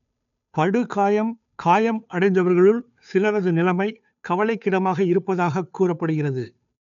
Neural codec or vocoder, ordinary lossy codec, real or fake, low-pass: codec, 16 kHz, 2 kbps, FunCodec, trained on Chinese and English, 25 frames a second; none; fake; 7.2 kHz